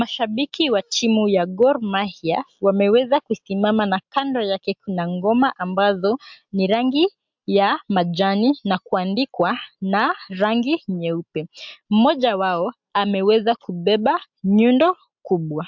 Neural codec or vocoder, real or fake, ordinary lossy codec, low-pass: none; real; MP3, 64 kbps; 7.2 kHz